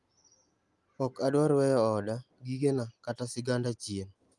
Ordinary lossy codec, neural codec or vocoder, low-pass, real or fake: Opus, 32 kbps; none; 10.8 kHz; real